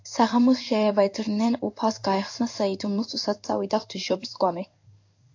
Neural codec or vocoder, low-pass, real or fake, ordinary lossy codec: codec, 16 kHz in and 24 kHz out, 1 kbps, XY-Tokenizer; 7.2 kHz; fake; AAC, 48 kbps